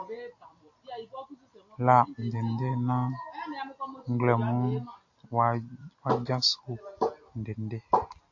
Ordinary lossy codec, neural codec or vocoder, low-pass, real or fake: AAC, 48 kbps; none; 7.2 kHz; real